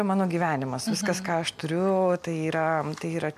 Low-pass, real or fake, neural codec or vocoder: 14.4 kHz; real; none